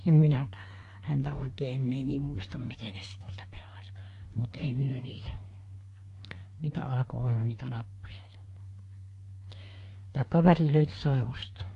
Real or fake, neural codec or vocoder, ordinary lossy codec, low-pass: fake; codec, 24 kHz, 1 kbps, SNAC; AAC, 48 kbps; 10.8 kHz